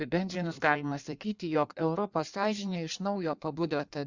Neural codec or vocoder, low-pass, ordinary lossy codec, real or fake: codec, 16 kHz in and 24 kHz out, 1.1 kbps, FireRedTTS-2 codec; 7.2 kHz; Opus, 64 kbps; fake